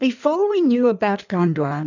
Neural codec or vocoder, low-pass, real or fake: codec, 16 kHz in and 24 kHz out, 1.1 kbps, FireRedTTS-2 codec; 7.2 kHz; fake